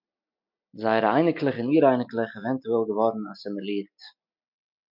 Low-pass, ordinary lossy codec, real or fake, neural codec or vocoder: 5.4 kHz; MP3, 48 kbps; real; none